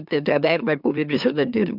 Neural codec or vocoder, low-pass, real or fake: autoencoder, 44.1 kHz, a latent of 192 numbers a frame, MeloTTS; 5.4 kHz; fake